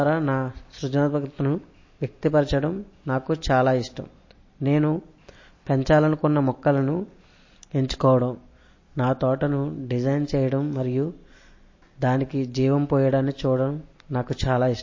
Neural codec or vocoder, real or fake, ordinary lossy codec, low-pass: none; real; MP3, 32 kbps; 7.2 kHz